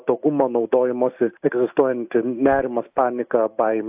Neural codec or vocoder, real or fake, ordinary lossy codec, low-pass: none; real; AAC, 32 kbps; 3.6 kHz